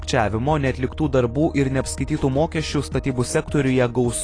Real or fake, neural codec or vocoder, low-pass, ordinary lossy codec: real; none; 9.9 kHz; AAC, 32 kbps